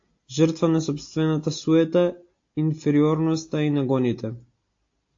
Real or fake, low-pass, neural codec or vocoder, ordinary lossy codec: real; 7.2 kHz; none; AAC, 48 kbps